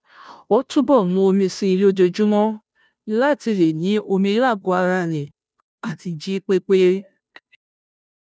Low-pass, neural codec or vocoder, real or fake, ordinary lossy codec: none; codec, 16 kHz, 0.5 kbps, FunCodec, trained on Chinese and English, 25 frames a second; fake; none